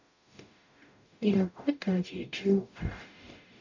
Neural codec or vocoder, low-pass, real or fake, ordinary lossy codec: codec, 44.1 kHz, 0.9 kbps, DAC; 7.2 kHz; fake; none